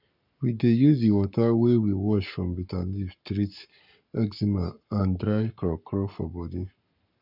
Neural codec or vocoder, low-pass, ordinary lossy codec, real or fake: codec, 44.1 kHz, 7.8 kbps, Pupu-Codec; 5.4 kHz; none; fake